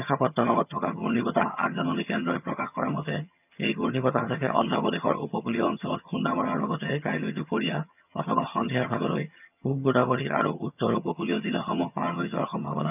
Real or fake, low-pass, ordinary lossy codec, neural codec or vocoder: fake; 3.6 kHz; none; vocoder, 22.05 kHz, 80 mel bands, HiFi-GAN